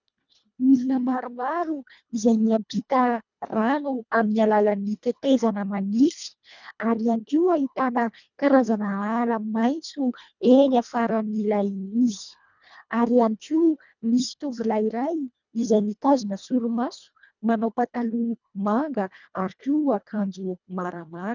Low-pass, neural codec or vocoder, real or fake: 7.2 kHz; codec, 24 kHz, 1.5 kbps, HILCodec; fake